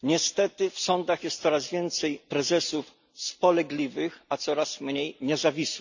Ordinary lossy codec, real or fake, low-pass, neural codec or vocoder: none; real; 7.2 kHz; none